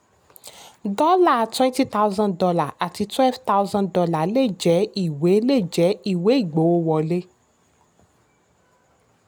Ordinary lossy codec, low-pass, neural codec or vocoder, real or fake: none; none; none; real